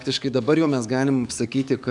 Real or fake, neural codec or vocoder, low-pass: fake; codec, 44.1 kHz, 7.8 kbps, DAC; 10.8 kHz